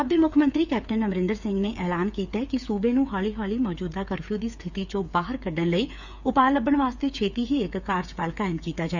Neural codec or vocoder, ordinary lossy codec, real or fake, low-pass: codec, 16 kHz, 8 kbps, FreqCodec, smaller model; none; fake; 7.2 kHz